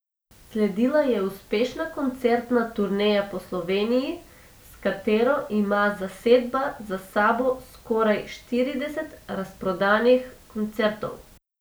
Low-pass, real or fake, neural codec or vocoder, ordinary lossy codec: none; real; none; none